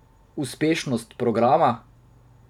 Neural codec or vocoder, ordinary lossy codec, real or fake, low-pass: vocoder, 44.1 kHz, 128 mel bands every 512 samples, BigVGAN v2; none; fake; 19.8 kHz